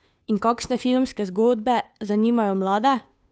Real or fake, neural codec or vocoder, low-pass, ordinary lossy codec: fake; codec, 16 kHz, 2 kbps, FunCodec, trained on Chinese and English, 25 frames a second; none; none